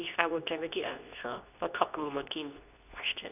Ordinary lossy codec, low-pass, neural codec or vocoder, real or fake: none; 3.6 kHz; codec, 24 kHz, 0.9 kbps, WavTokenizer, medium speech release version 2; fake